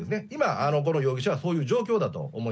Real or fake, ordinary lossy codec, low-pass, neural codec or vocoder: real; none; none; none